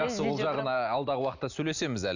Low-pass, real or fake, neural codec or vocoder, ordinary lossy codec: 7.2 kHz; real; none; none